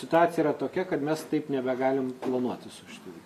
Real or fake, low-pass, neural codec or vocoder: real; 14.4 kHz; none